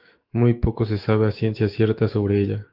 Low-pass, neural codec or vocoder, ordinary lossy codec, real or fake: 5.4 kHz; autoencoder, 48 kHz, 128 numbers a frame, DAC-VAE, trained on Japanese speech; Opus, 32 kbps; fake